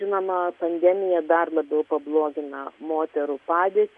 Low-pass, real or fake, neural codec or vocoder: 10.8 kHz; real; none